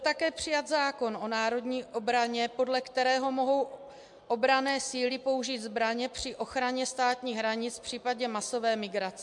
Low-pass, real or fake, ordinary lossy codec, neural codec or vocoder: 10.8 kHz; real; MP3, 64 kbps; none